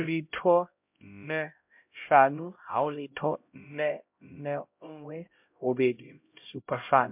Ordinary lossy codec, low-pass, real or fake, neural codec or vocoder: MP3, 32 kbps; 3.6 kHz; fake; codec, 16 kHz, 0.5 kbps, X-Codec, HuBERT features, trained on LibriSpeech